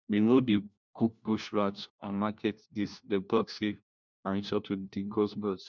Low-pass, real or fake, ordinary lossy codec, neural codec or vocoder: 7.2 kHz; fake; none; codec, 16 kHz, 1 kbps, FunCodec, trained on LibriTTS, 50 frames a second